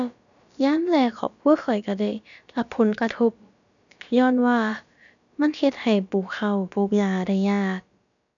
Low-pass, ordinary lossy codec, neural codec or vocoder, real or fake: 7.2 kHz; none; codec, 16 kHz, about 1 kbps, DyCAST, with the encoder's durations; fake